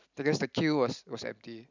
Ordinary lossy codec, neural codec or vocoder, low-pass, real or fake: none; none; 7.2 kHz; real